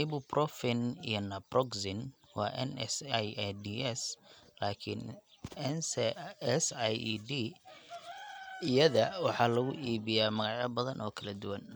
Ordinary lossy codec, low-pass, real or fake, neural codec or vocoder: none; none; real; none